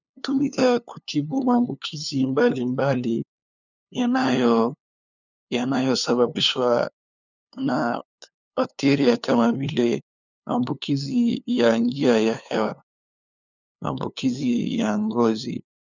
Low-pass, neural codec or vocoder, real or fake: 7.2 kHz; codec, 16 kHz, 2 kbps, FunCodec, trained on LibriTTS, 25 frames a second; fake